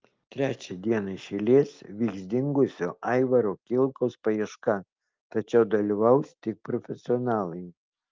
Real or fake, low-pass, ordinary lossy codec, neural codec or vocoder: real; 7.2 kHz; Opus, 24 kbps; none